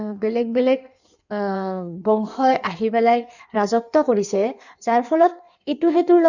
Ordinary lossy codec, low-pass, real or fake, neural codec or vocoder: none; 7.2 kHz; fake; codec, 16 kHz in and 24 kHz out, 1.1 kbps, FireRedTTS-2 codec